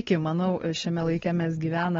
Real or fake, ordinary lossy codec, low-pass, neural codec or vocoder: fake; AAC, 24 kbps; 7.2 kHz; codec, 16 kHz, 4 kbps, X-Codec, WavLM features, trained on Multilingual LibriSpeech